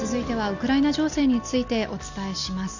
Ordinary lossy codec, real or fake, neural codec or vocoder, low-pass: none; real; none; 7.2 kHz